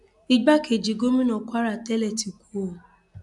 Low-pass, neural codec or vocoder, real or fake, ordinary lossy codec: 10.8 kHz; none; real; none